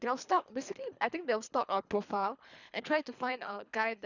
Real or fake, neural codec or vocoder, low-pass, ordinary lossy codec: fake; codec, 24 kHz, 3 kbps, HILCodec; 7.2 kHz; none